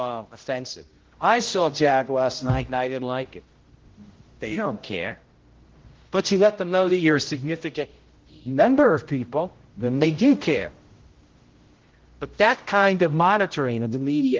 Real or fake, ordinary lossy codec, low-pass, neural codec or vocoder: fake; Opus, 16 kbps; 7.2 kHz; codec, 16 kHz, 0.5 kbps, X-Codec, HuBERT features, trained on general audio